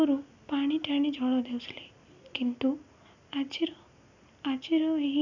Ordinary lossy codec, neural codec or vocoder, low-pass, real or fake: none; none; 7.2 kHz; real